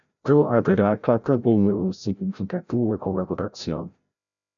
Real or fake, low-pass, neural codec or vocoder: fake; 7.2 kHz; codec, 16 kHz, 0.5 kbps, FreqCodec, larger model